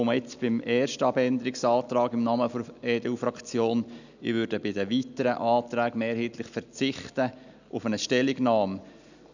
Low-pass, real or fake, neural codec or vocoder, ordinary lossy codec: 7.2 kHz; real; none; none